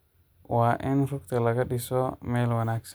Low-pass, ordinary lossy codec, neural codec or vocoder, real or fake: none; none; none; real